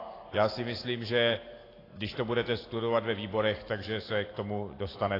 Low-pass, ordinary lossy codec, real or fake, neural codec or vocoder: 5.4 kHz; AAC, 24 kbps; real; none